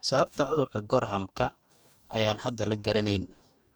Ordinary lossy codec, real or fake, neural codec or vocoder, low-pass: none; fake; codec, 44.1 kHz, 2.6 kbps, DAC; none